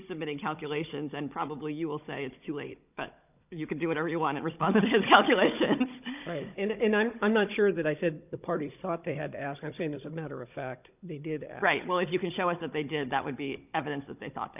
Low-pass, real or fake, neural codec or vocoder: 3.6 kHz; fake; codec, 16 kHz, 16 kbps, FunCodec, trained on Chinese and English, 50 frames a second